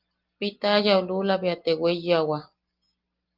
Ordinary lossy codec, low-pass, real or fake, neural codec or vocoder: Opus, 32 kbps; 5.4 kHz; real; none